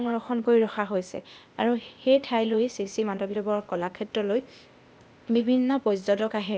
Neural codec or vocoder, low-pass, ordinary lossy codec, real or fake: codec, 16 kHz, 0.8 kbps, ZipCodec; none; none; fake